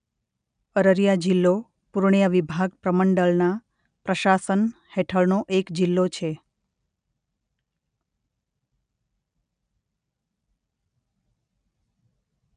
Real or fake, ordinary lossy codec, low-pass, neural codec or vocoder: real; none; 9.9 kHz; none